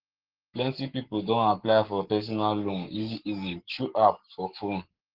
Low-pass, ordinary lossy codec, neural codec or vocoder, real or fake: 5.4 kHz; Opus, 16 kbps; codec, 44.1 kHz, 7.8 kbps, Pupu-Codec; fake